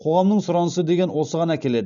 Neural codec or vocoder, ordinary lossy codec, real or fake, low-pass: none; none; real; 7.2 kHz